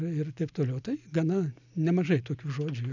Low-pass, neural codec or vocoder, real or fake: 7.2 kHz; none; real